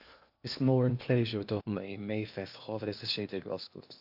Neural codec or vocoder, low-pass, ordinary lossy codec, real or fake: codec, 16 kHz in and 24 kHz out, 0.8 kbps, FocalCodec, streaming, 65536 codes; 5.4 kHz; AAC, 48 kbps; fake